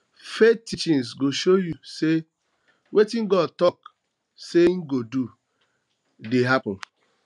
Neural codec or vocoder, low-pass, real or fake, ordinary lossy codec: none; 10.8 kHz; real; none